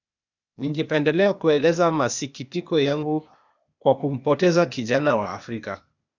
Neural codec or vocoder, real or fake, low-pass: codec, 16 kHz, 0.8 kbps, ZipCodec; fake; 7.2 kHz